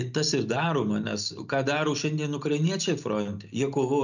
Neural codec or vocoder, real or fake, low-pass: none; real; 7.2 kHz